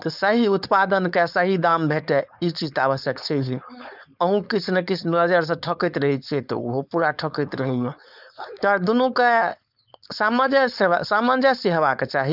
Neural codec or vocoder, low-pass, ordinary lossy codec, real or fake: codec, 16 kHz, 4.8 kbps, FACodec; 5.4 kHz; none; fake